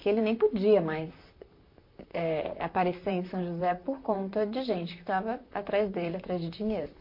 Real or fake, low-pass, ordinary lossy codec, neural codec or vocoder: fake; 5.4 kHz; MP3, 32 kbps; vocoder, 44.1 kHz, 128 mel bands, Pupu-Vocoder